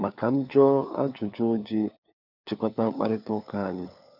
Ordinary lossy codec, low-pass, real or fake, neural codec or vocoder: none; 5.4 kHz; fake; codec, 16 kHz, 4 kbps, FunCodec, trained on LibriTTS, 50 frames a second